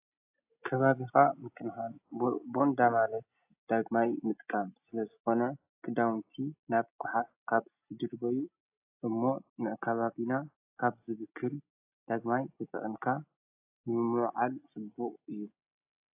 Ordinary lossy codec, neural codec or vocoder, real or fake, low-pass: AAC, 32 kbps; none; real; 3.6 kHz